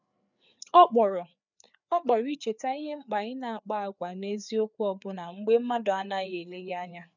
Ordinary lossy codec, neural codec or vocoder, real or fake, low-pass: none; codec, 16 kHz, 4 kbps, FreqCodec, larger model; fake; 7.2 kHz